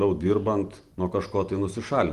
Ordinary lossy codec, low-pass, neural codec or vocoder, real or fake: Opus, 24 kbps; 14.4 kHz; none; real